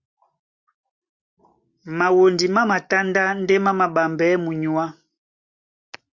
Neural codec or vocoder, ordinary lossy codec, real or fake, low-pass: none; Opus, 64 kbps; real; 7.2 kHz